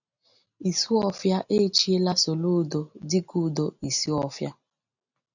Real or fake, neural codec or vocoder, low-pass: real; none; 7.2 kHz